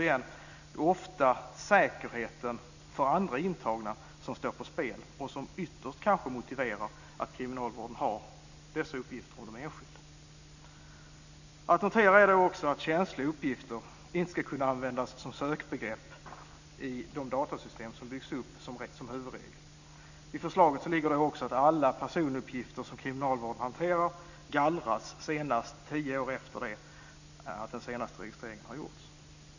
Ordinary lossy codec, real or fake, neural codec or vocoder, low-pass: none; real; none; 7.2 kHz